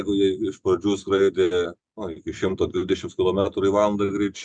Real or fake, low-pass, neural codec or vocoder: real; 9.9 kHz; none